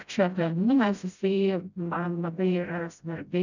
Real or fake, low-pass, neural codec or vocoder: fake; 7.2 kHz; codec, 16 kHz, 0.5 kbps, FreqCodec, smaller model